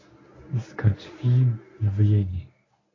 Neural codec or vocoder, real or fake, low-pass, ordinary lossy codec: codec, 24 kHz, 0.9 kbps, WavTokenizer, medium speech release version 1; fake; 7.2 kHz; AAC, 32 kbps